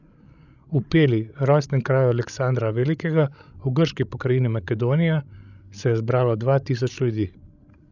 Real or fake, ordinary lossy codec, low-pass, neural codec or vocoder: fake; none; 7.2 kHz; codec, 16 kHz, 16 kbps, FreqCodec, larger model